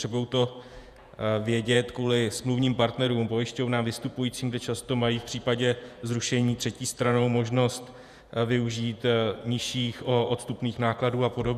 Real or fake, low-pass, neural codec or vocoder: fake; 14.4 kHz; vocoder, 48 kHz, 128 mel bands, Vocos